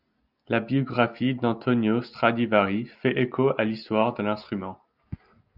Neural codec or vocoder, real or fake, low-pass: none; real; 5.4 kHz